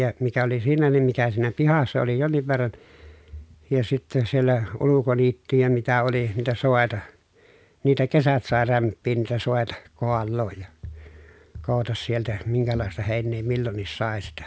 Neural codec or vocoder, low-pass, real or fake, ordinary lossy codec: none; none; real; none